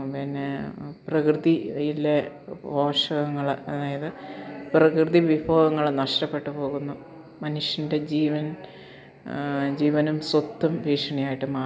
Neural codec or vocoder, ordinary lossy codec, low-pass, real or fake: none; none; none; real